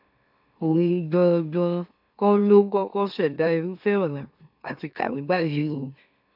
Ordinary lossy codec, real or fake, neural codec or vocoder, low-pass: AAC, 48 kbps; fake; autoencoder, 44.1 kHz, a latent of 192 numbers a frame, MeloTTS; 5.4 kHz